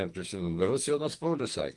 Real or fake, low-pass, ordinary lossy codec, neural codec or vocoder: fake; 10.8 kHz; Opus, 24 kbps; codec, 44.1 kHz, 2.6 kbps, SNAC